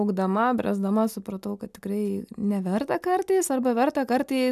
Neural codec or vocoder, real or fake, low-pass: none; real; 14.4 kHz